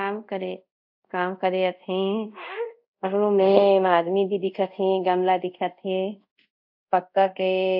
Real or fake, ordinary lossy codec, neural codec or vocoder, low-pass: fake; AAC, 48 kbps; codec, 24 kHz, 0.5 kbps, DualCodec; 5.4 kHz